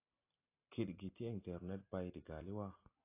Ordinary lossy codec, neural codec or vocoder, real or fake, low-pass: AAC, 24 kbps; none; real; 3.6 kHz